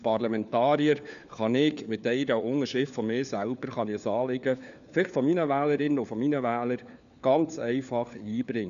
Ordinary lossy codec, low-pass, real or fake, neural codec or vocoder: none; 7.2 kHz; fake; codec, 16 kHz, 4 kbps, FunCodec, trained on LibriTTS, 50 frames a second